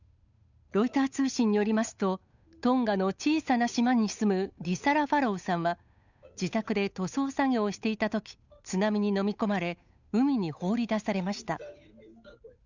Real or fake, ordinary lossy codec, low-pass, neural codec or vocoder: fake; none; 7.2 kHz; codec, 16 kHz, 8 kbps, FunCodec, trained on Chinese and English, 25 frames a second